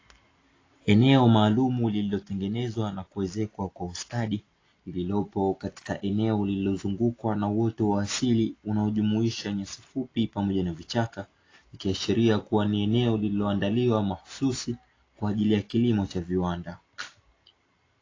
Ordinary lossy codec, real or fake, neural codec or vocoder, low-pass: AAC, 32 kbps; real; none; 7.2 kHz